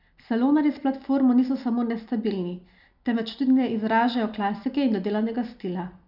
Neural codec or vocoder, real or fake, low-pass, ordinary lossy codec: none; real; 5.4 kHz; none